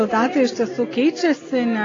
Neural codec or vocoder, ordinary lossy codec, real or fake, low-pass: none; AAC, 32 kbps; real; 7.2 kHz